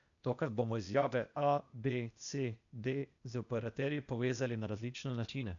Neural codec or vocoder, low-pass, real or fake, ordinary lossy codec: codec, 16 kHz, 0.8 kbps, ZipCodec; 7.2 kHz; fake; none